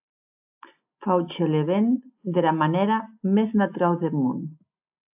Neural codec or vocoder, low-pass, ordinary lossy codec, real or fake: none; 3.6 kHz; AAC, 32 kbps; real